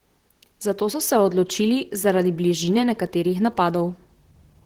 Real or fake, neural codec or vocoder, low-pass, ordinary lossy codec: fake; vocoder, 44.1 kHz, 128 mel bands, Pupu-Vocoder; 19.8 kHz; Opus, 16 kbps